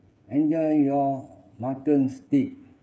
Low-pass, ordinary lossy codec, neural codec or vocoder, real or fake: none; none; codec, 16 kHz, 8 kbps, FreqCodec, smaller model; fake